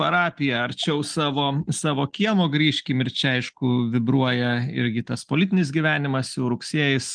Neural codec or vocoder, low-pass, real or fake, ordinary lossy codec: none; 9.9 kHz; real; Opus, 24 kbps